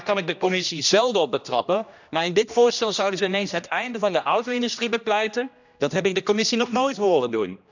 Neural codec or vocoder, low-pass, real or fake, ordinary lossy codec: codec, 16 kHz, 1 kbps, X-Codec, HuBERT features, trained on general audio; 7.2 kHz; fake; none